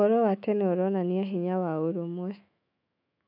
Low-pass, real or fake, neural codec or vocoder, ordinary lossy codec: 5.4 kHz; fake; autoencoder, 48 kHz, 128 numbers a frame, DAC-VAE, trained on Japanese speech; none